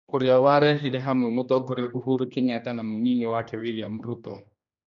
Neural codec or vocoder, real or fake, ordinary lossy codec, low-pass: codec, 16 kHz, 1 kbps, X-Codec, HuBERT features, trained on general audio; fake; none; 7.2 kHz